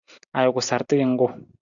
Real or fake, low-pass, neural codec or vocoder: real; 7.2 kHz; none